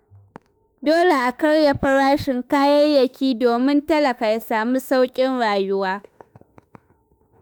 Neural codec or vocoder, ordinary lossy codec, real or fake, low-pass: autoencoder, 48 kHz, 32 numbers a frame, DAC-VAE, trained on Japanese speech; none; fake; none